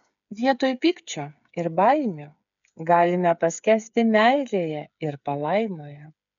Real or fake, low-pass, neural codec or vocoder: fake; 7.2 kHz; codec, 16 kHz, 8 kbps, FreqCodec, smaller model